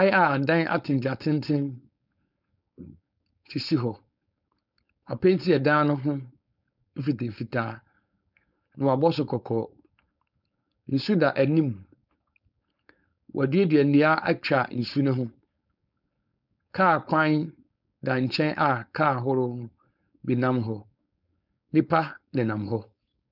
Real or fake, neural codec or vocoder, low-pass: fake; codec, 16 kHz, 4.8 kbps, FACodec; 5.4 kHz